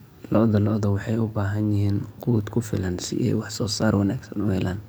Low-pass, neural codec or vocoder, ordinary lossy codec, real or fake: none; codec, 44.1 kHz, 7.8 kbps, DAC; none; fake